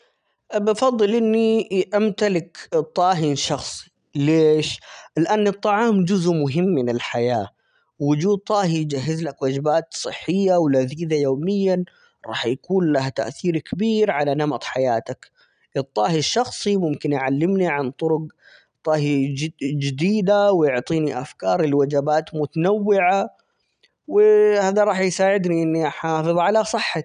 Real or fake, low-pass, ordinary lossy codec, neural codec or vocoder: real; 9.9 kHz; none; none